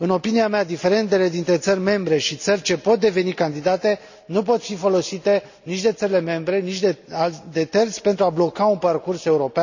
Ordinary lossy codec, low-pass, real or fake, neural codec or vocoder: none; 7.2 kHz; real; none